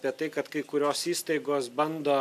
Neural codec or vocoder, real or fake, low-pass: none; real; 14.4 kHz